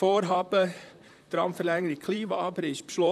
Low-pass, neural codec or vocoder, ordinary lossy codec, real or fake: 14.4 kHz; vocoder, 44.1 kHz, 128 mel bands, Pupu-Vocoder; none; fake